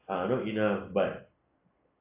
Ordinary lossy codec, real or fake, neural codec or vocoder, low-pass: AAC, 24 kbps; real; none; 3.6 kHz